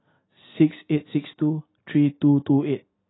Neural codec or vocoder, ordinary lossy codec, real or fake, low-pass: none; AAC, 16 kbps; real; 7.2 kHz